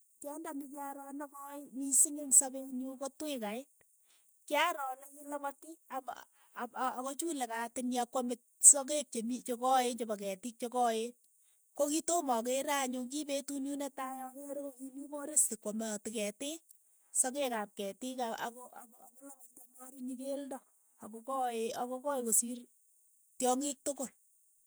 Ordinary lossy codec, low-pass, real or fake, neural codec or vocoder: none; none; fake; vocoder, 48 kHz, 128 mel bands, Vocos